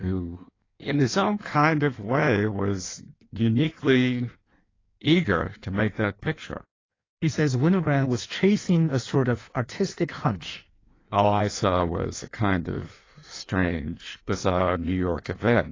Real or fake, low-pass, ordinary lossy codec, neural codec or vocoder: fake; 7.2 kHz; AAC, 32 kbps; codec, 16 kHz in and 24 kHz out, 1.1 kbps, FireRedTTS-2 codec